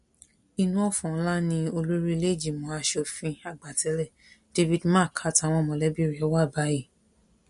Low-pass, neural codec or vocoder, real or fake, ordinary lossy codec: 14.4 kHz; none; real; MP3, 48 kbps